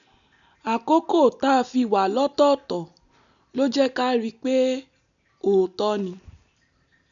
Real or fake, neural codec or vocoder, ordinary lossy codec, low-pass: real; none; none; 7.2 kHz